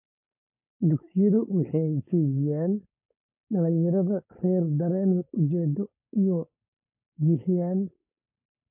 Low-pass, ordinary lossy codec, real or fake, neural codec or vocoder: 3.6 kHz; MP3, 32 kbps; fake; codec, 16 kHz, 4.8 kbps, FACodec